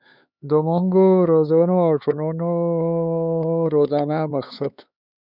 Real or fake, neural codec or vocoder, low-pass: fake; codec, 16 kHz, 4 kbps, X-Codec, HuBERT features, trained on LibriSpeech; 5.4 kHz